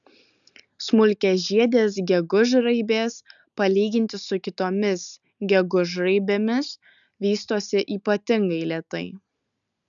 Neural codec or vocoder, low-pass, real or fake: none; 7.2 kHz; real